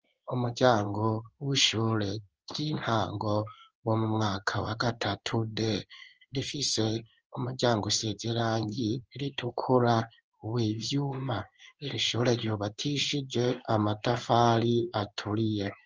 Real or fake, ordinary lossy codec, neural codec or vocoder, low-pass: fake; Opus, 24 kbps; codec, 16 kHz in and 24 kHz out, 1 kbps, XY-Tokenizer; 7.2 kHz